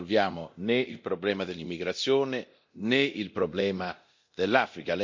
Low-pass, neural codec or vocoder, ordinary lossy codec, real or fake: 7.2 kHz; codec, 24 kHz, 0.9 kbps, DualCodec; MP3, 48 kbps; fake